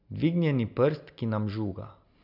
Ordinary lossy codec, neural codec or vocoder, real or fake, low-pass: none; none; real; 5.4 kHz